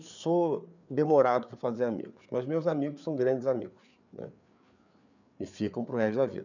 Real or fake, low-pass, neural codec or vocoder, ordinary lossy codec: fake; 7.2 kHz; codec, 16 kHz, 4 kbps, FreqCodec, larger model; none